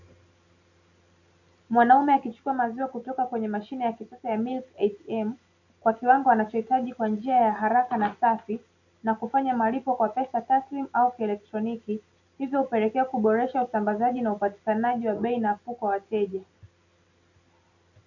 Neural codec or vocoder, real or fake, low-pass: none; real; 7.2 kHz